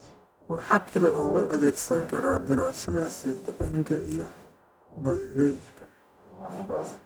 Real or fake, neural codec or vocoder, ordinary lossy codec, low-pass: fake; codec, 44.1 kHz, 0.9 kbps, DAC; none; none